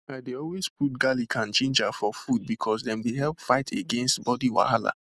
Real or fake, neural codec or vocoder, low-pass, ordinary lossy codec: real; none; none; none